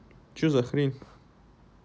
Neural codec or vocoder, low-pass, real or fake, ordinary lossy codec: none; none; real; none